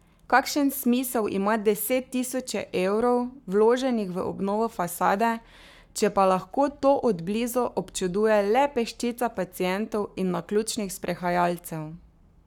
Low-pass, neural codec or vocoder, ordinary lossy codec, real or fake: 19.8 kHz; codec, 44.1 kHz, 7.8 kbps, Pupu-Codec; none; fake